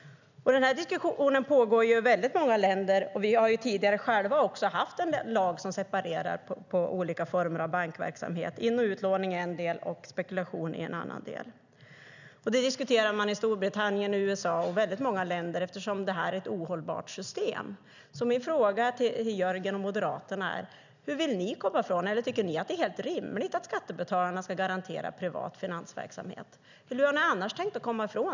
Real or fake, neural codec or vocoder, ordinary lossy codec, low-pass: real; none; none; 7.2 kHz